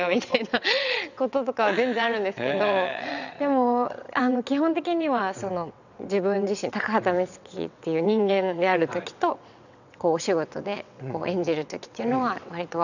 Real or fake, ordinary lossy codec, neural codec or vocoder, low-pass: fake; none; vocoder, 22.05 kHz, 80 mel bands, WaveNeXt; 7.2 kHz